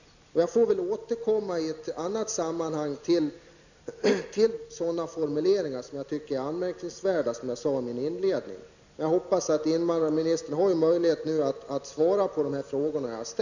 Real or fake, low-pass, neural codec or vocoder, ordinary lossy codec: real; 7.2 kHz; none; none